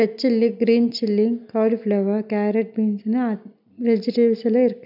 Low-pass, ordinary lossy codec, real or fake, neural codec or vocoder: 5.4 kHz; none; real; none